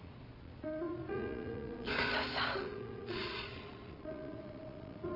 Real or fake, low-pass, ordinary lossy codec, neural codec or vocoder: fake; 5.4 kHz; none; vocoder, 22.05 kHz, 80 mel bands, Vocos